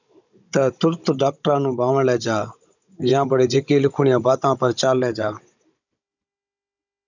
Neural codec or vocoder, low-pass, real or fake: codec, 16 kHz, 16 kbps, FunCodec, trained on Chinese and English, 50 frames a second; 7.2 kHz; fake